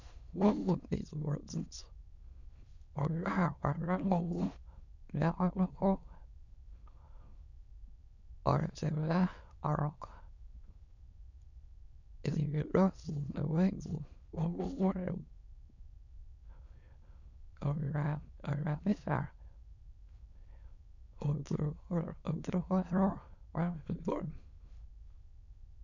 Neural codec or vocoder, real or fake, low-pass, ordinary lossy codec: autoencoder, 22.05 kHz, a latent of 192 numbers a frame, VITS, trained on many speakers; fake; 7.2 kHz; none